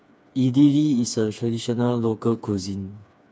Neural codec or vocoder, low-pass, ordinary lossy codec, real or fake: codec, 16 kHz, 4 kbps, FreqCodec, smaller model; none; none; fake